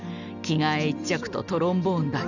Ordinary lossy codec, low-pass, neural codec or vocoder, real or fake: none; 7.2 kHz; none; real